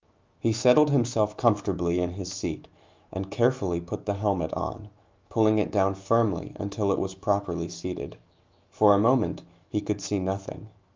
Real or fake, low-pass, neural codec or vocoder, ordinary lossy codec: fake; 7.2 kHz; autoencoder, 48 kHz, 128 numbers a frame, DAC-VAE, trained on Japanese speech; Opus, 24 kbps